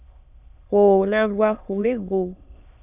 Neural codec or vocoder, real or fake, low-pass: autoencoder, 22.05 kHz, a latent of 192 numbers a frame, VITS, trained on many speakers; fake; 3.6 kHz